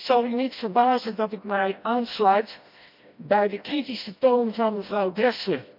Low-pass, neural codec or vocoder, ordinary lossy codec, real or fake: 5.4 kHz; codec, 16 kHz, 1 kbps, FreqCodec, smaller model; MP3, 48 kbps; fake